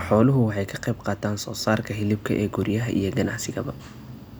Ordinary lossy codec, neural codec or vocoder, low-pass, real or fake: none; none; none; real